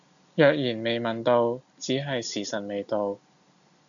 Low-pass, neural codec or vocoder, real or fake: 7.2 kHz; none; real